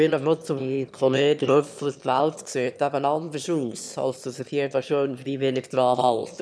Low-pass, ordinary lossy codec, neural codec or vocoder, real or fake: none; none; autoencoder, 22.05 kHz, a latent of 192 numbers a frame, VITS, trained on one speaker; fake